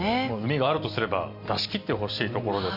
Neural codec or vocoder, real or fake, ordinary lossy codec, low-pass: none; real; none; 5.4 kHz